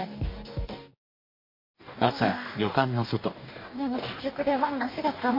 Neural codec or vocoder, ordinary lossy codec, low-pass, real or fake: codec, 44.1 kHz, 2.6 kbps, DAC; MP3, 32 kbps; 5.4 kHz; fake